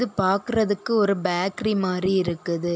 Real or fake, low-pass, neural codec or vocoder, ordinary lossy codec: real; none; none; none